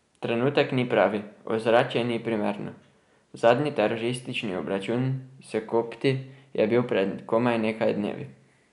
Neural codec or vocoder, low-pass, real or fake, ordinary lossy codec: none; 10.8 kHz; real; none